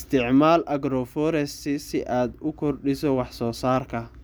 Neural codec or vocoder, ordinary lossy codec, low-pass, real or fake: none; none; none; real